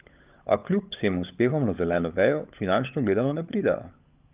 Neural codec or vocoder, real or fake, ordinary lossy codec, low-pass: codec, 16 kHz, 16 kbps, FunCodec, trained on LibriTTS, 50 frames a second; fake; Opus, 24 kbps; 3.6 kHz